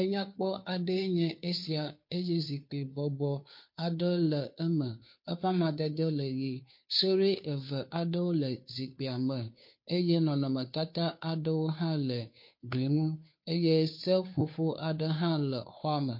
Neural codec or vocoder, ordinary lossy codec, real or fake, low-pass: codec, 16 kHz, 2 kbps, FunCodec, trained on Chinese and English, 25 frames a second; MP3, 32 kbps; fake; 5.4 kHz